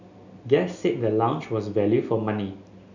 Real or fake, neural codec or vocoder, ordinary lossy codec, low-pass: real; none; none; 7.2 kHz